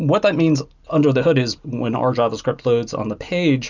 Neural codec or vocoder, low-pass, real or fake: none; 7.2 kHz; real